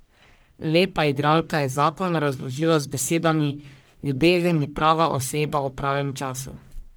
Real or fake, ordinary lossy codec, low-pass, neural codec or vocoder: fake; none; none; codec, 44.1 kHz, 1.7 kbps, Pupu-Codec